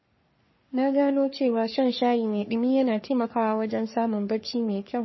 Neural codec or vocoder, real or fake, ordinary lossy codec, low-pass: codec, 44.1 kHz, 3.4 kbps, Pupu-Codec; fake; MP3, 24 kbps; 7.2 kHz